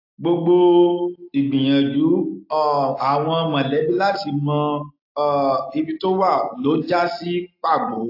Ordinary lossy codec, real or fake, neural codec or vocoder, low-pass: AAC, 32 kbps; real; none; 5.4 kHz